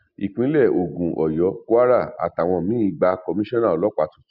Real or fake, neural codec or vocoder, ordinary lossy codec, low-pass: real; none; none; 5.4 kHz